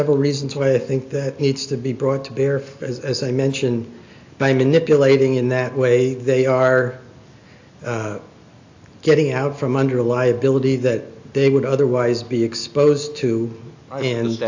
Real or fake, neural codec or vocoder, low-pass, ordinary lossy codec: real; none; 7.2 kHz; AAC, 48 kbps